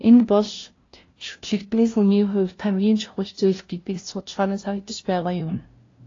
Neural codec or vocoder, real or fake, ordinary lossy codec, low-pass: codec, 16 kHz, 0.5 kbps, FunCodec, trained on LibriTTS, 25 frames a second; fake; AAC, 32 kbps; 7.2 kHz